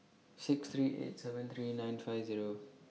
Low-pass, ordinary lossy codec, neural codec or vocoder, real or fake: none; none; none; real